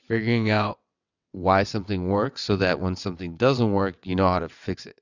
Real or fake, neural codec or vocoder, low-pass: fake; vocoder, 22.05 kHz, 80 mel bands, WaveNeXt; 7.2 kHz